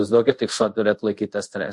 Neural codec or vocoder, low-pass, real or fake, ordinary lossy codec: codec, 24 kHz, 0.5 kbps, DualCodec; 10.8 kHz; fake; MP3, 48 kbps